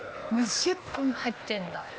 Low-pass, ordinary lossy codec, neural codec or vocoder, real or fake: none; none; codec, 16 kHz, 0.8 kbps, ZipCodec; fake